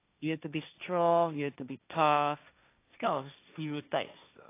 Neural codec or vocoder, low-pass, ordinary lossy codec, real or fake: codec, 16 kHz, 1.1 kbps, Voila-Tokenizer; 3.6 kHz; AAC, 24 kbps; fake